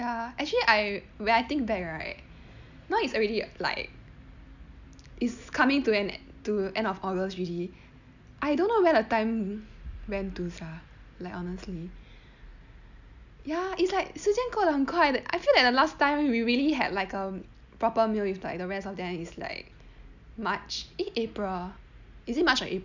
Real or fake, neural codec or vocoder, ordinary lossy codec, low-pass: real; none; none; 7.2 kHz